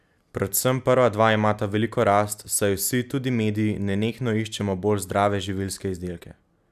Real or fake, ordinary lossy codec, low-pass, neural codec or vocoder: real; none; 14.4 kHz; none